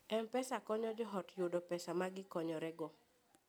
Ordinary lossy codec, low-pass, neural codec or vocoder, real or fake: none; none; none; real